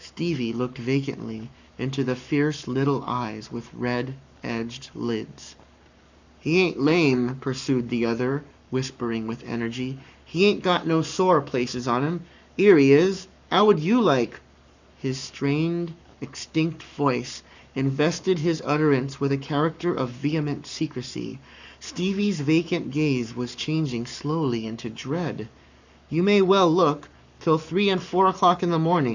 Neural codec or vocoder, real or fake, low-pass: codec, 44.1 kHz, 7.8 kbps, Pupu-Codec; fake; 7.2 kHz